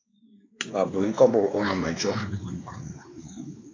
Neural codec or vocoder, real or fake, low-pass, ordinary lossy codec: codec, 16 kHz, 2 kbps, X-Codec, WavLM features, trained on Multilingual LibriSpeech; fake; 7.2 kHz; AAC, 32 kbps